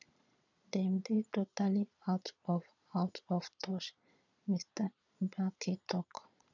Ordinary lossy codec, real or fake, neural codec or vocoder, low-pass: none; fake; vocoder, 22.05 kHz, 80 mel bands, Vocos; 7.2 kHz